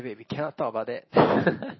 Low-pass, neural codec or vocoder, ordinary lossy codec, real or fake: 7.2 kHz; none; MP3, 24 kbps; real